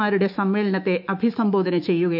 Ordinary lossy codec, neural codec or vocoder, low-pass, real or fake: none; autoencoder, 48 kHz, 128 numbers a frame, DAC-VAE, trained on Japanese speech; 5.4 kHz; fake